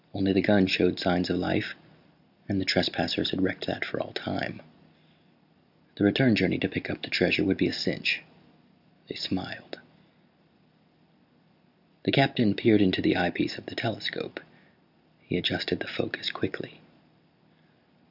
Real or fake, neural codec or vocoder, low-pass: real; none; 5.4 kHz